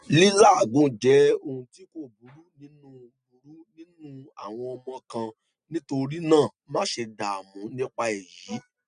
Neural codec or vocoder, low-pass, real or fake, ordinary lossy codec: none; 9.9 kHz; real; none